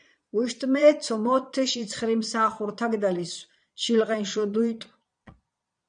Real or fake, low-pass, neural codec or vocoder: fake; 9.9 kHz; vocoder, 22.05 kHz, 80 mel bands, Vocos